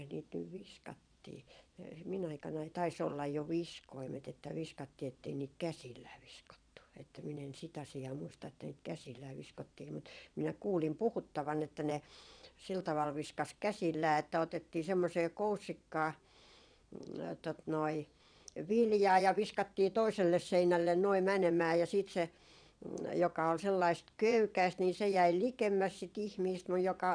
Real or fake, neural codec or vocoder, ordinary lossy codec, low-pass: fake; vocoder, 22.05 kHz, 80 mel bands, Vocos; none; 9.9 kHz